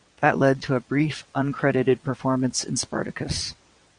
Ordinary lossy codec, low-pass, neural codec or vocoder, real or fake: Opus, 64 kbps; 9.9 kHz; vocoder, 22.05 kHz, 80 mel bands, WaveNeXt; fake